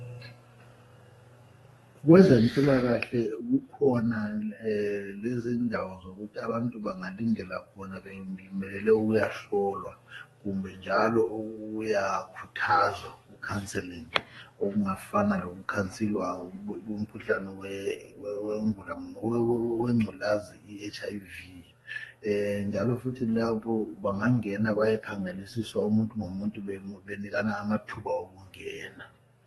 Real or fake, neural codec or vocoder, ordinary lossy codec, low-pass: fake; codec, 32 kHz, 1.9 kbps, SNAC; AAC, 32 kbps; 14.4 kHz